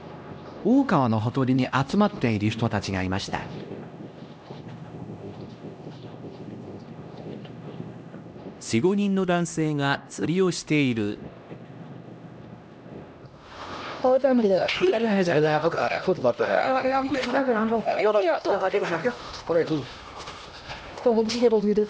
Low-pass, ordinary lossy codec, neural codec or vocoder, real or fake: none; none; codec, 16 kHz, 1 kbps, X-Codec, HuBERT features, trained on LibriSpeech; fake